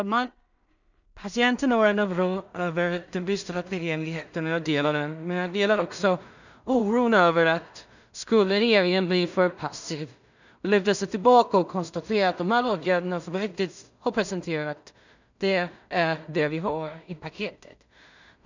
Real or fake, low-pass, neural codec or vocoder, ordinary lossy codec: fake; 7.2 kHz; codec, 16 kHz in and 24 kHz out, 0.4 kbps, LongCat-Audio-Codec, two codebook decoder; none